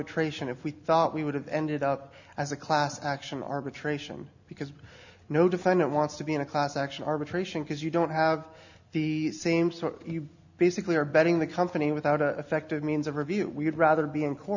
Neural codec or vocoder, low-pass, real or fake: none; 7.2 kHz; real